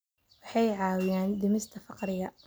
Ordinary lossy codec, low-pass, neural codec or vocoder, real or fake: none; none; none; real